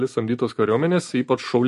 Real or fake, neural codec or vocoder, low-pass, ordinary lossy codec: real; none; 14.4 kHz; MP3, 48 kbps